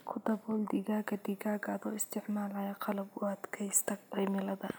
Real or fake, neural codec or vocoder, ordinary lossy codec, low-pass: real; none; none; none